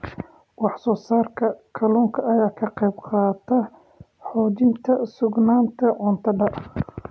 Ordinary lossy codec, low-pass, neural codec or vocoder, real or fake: none; none; none; real